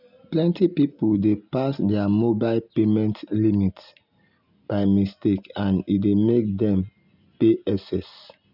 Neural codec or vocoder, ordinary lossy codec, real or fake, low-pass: none; none; real; 5.4 kHz